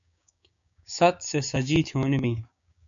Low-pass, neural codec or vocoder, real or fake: 7.2 kHz; codec, 16 kHz, 6 kbps, DAC; fake